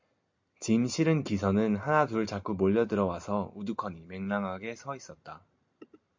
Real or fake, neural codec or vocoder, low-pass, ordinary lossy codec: real; none; 7.2 kHz; MP3, 48 kbps